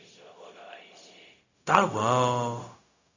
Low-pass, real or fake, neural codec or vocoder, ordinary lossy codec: 7.2 kHz; fake; codec, 16 kHz, 0.4 kbps, LongCat-Audio-Codec; Opus, 64 kbps